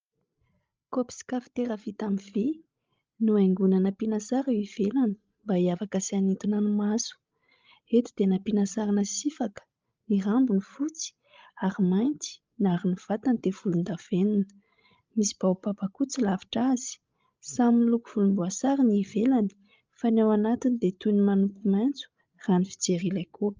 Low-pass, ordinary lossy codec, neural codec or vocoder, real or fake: 7.2 kHz; Opus, 24 kbps; codec, 16 kHz, 16 kbps, FreqCodec, larger model; fake